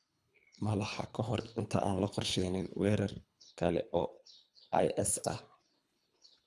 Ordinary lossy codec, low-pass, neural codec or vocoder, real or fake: none; none; codec, 24 kHz, 3 kbps, HILCodec; fake